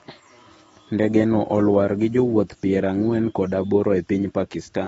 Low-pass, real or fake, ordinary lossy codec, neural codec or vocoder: 19.8 kHz; fake; AAC, 24 kbps; autoencoder, 48 kHz, 128 numbers a frame, DAC-VAE, trained on Japanese speech